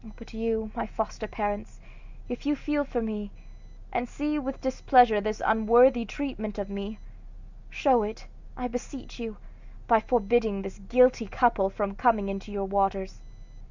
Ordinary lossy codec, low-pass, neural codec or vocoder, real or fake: Opus, 64 kbps; 7.2 kHz; none; real